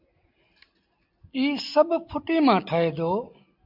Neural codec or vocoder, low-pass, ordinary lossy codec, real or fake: none; 5.4 kHz; MP3, 48 kbps; real